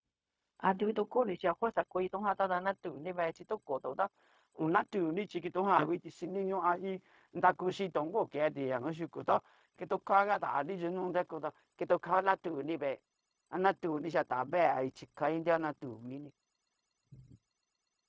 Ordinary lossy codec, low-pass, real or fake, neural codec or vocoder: none; none; fake; codec, 16 kHz, 0.4 kbps, LongCat-Audio-Codec